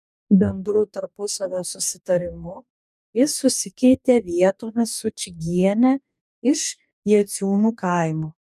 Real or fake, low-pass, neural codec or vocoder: fake; 14.4 kHz; codec, 44.1 kHz, 2.6 kbps, DAC